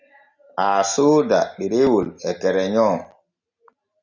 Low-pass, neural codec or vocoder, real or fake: 7.2 kHz; none; real